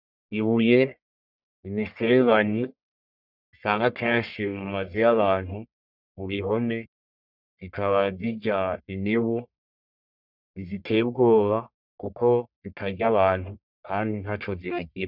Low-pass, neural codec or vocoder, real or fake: 5.4 kHz; codec, 44.1 kHz, 1.7 kbps, Pupu-Codec; fake